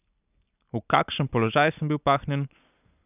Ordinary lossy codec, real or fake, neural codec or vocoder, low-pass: none; real; none; 3.6 kHz